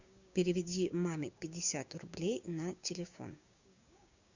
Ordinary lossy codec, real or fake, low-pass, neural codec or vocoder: Opus, 64 kbps; fake; 7.2 kHz; codec, 16 kHz, 6 kbps, DAC